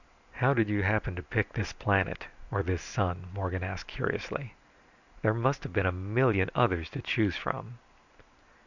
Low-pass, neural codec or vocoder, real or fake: 7.2 kHz; none; real